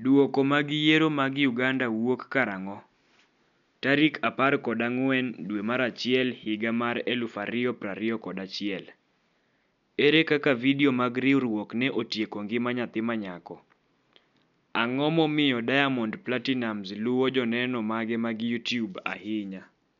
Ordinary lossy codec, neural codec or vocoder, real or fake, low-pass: none; none; real; 7.2 kHz